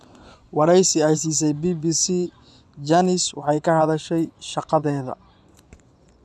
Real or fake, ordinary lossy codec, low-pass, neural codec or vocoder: fake; none; none; vocoder, 24 kHz, 100 mel bands, Vocos